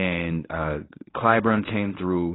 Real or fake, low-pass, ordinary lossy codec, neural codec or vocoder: fake; 7.2 kHz; AAC, 16 kbps; codec, 24 kHz, 0.9 kbps, WavTokenizer, medium speech release version 1